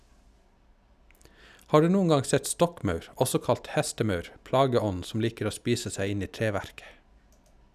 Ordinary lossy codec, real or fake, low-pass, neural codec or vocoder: none; real; 14.4 kHz; none